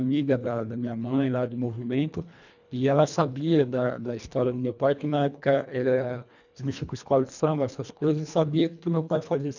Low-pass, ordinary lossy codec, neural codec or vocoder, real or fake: 7.2 kHz; none; codec, 24 kHz, 1.5 kbps, HILCodec; fake